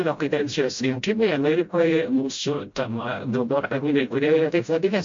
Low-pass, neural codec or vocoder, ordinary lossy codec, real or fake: 7.2 kHz; codec, 16 kHz, 0.5 kbps, FreqCodec, smaller model; MP3, 48 kbps; fake